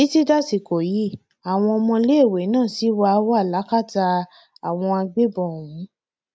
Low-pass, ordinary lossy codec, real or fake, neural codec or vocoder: none; none; real; none